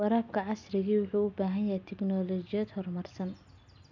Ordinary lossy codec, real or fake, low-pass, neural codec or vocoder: none; real; 7.2 kHz; none